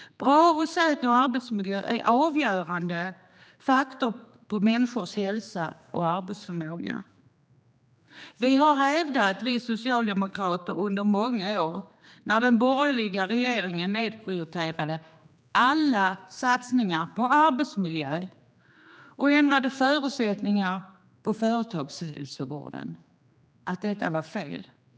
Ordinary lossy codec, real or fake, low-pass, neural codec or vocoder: none; fake; none; codec, 16 kHz, 2 kbps, X-Codec, HuBERT features, trained on general audio